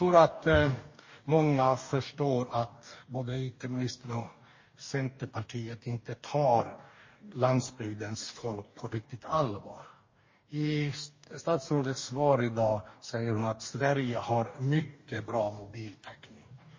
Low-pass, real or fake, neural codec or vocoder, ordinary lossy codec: 7.2 kHz; fake; codec, 44.1 kHz, 2.6 kbps, DAC; MP3, 32 kbps